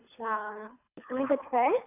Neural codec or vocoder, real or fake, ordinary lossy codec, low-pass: codec, 24 kHz, 3 kbps, HILCodec; fake; none; 3.6 kHz